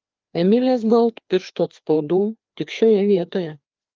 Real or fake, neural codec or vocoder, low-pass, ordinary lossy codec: fake; codec, 16 kHz, 2 kbps, FreqCodec, larger model; 7.2 kHz; Opus, 32 kbps